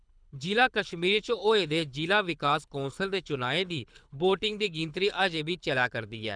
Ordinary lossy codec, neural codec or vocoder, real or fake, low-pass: none; codec, 24 kHz, 6 kbps, HILCodec; fake; 9.9 kHz